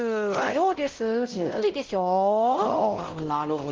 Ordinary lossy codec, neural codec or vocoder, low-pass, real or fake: Opus, 16 kbps; codec, 16 kHz, 0.5 kbps, X-Codec, WavLM features, trained on Multilingual LibriSpeech; 7.2 kHz; fake